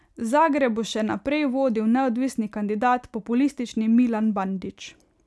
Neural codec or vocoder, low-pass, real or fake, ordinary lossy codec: none; none; real; none